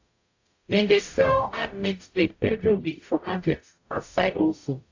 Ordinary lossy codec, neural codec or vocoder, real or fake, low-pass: AAC, 48 kbps; codec, 44.1 kHz, 0.9 kbps, DAC; fake; 7.2 kHz